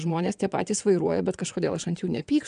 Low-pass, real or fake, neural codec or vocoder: 9.9 kHz; fake; vocoder, 22.05 kHz, 80 mel bands, WaveNeXt